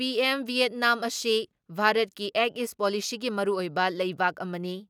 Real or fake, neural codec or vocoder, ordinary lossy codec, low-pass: real; none; none; 19.8 kHz